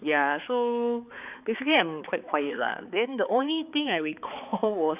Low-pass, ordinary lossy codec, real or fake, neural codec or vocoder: 3.6 kHz; none; fake; codec, 16 kHz, 4 kbps, X-Codec, HuBERT features, trained on balanced general audio